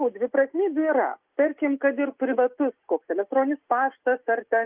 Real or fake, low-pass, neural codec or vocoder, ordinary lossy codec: real; 3.6 kHz; none; Opus, 32 kbps